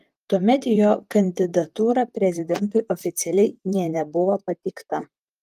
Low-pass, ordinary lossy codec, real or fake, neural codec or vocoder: 14.4 kHz; Opus, 32 kbps; fake; vocoder, 44.1 kHz, 128 mel bands, Pupu-Vocoder